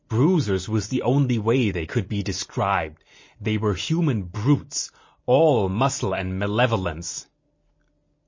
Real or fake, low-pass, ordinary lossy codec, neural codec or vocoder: real; 7.2 kHz; MP3, 32 kbps; none